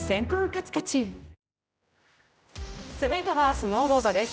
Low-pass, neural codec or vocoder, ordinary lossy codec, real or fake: none; codec, 16 kHz, 0.5 kbps, X-Codec, HuBERT features, trained on general audio; none; fake